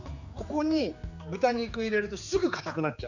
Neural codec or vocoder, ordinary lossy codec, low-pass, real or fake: codec, 16 kHz, 4 kbps, X-Codec, HuBERT features, trained on general audio; none; 7.2 kHz; fake